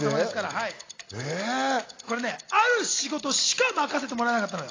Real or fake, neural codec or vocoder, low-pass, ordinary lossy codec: real; none; 7.2 kHz; AAC, 32 kbps